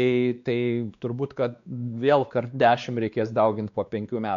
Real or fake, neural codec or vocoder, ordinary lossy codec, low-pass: fake; codec, 16 kHz, 2 kbps, X-Codec, WavLM features, trained on Multilingual LibriSpeech; MP3, 64 kbps; 7.2 kHz